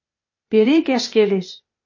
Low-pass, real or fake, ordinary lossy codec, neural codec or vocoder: 7.2 kHz; fake; MP3, 32 kbps; codec, 16 kHz, 0.8 kbps, ZipCodec